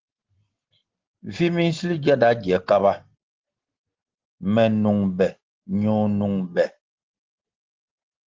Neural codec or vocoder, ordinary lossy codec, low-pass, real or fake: none; Opus, 16 kbps; 7.2 kHz; real